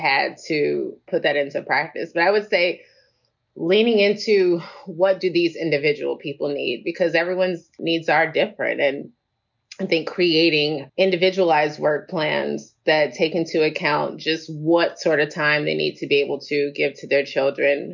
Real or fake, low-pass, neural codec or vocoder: real; 7.2 kHz; none